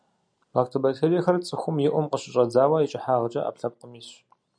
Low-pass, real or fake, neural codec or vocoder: 9.9 kHz; real; none